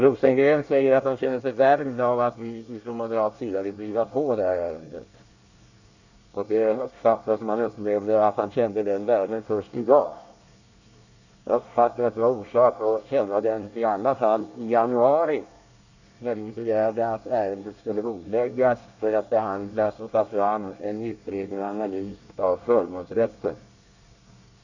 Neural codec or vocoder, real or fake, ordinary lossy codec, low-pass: codec, 24 kHz, 1 kbps, SNAC; fake; none; 7.2 kHz